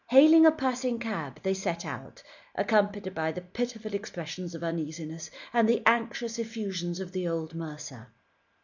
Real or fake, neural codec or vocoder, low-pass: real; none; 7.2 kHz